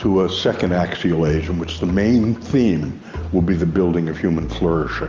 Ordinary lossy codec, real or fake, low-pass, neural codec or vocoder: Opus, 32 kbps; real; 7.2 kHz; none